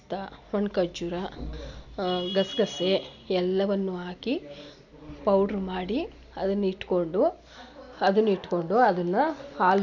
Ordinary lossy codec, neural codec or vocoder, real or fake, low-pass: none; none; real; 7.2 kHz